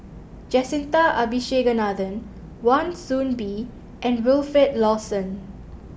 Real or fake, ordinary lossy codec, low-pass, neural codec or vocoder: real; none; none; none